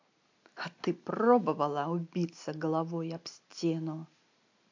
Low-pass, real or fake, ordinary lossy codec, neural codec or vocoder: 7.2 kHz; real; AAC, 48 kbps; none